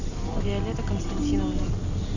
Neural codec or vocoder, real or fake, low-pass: none; real; 7.2 kHz